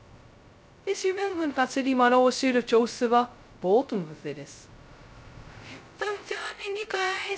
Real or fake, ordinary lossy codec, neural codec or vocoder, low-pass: fake; none; codec, 16 kHz, 0.2 kbps, FocalCodec; none